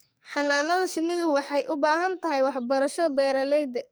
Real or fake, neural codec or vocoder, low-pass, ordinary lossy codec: fake; codec, 44.1 kHz, 2.6 kbps, SNAC; none; none